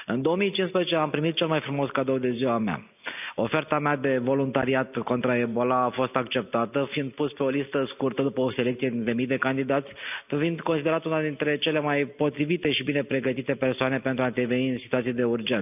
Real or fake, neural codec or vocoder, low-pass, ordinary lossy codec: real; none; 3.6 kHz; none